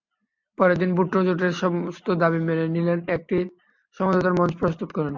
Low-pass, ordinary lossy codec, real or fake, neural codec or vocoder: 7.2 kHz; MP3, 64 kbps; real; none